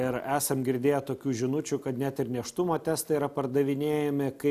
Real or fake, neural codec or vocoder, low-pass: real; none; 14.4 kHz